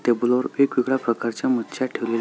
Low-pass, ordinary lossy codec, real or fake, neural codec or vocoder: none; none; real; none